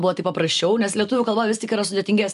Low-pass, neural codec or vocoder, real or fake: 10.8 kHz; none; real